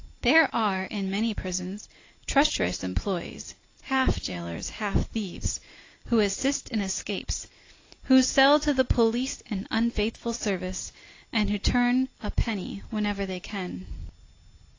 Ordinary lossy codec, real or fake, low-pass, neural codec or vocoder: AAC, 32 kbps; real; 7.2 kHz; none